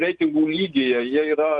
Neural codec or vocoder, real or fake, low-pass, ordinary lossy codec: none; real; 9.9 kHz; Opus, 24 kbps